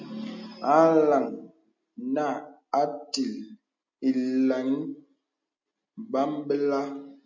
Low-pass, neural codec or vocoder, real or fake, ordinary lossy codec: 7.2 kHz; none; real; MP3, 64 kbps